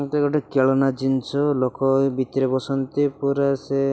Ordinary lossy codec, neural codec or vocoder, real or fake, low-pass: none; none; real; none